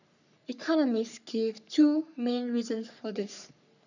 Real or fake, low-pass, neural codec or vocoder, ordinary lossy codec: fake; 7.2 kHz; codec, 44.1 kHz, 3.4 kbps, Pupu-Codec; none